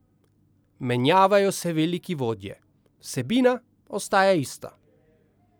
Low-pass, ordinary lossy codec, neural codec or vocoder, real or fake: none; none; none; real